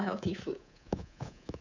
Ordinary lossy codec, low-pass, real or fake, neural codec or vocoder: none; 7.2 kHz; fake; codec, 24 kHz, 3.1 kbps, DualCodec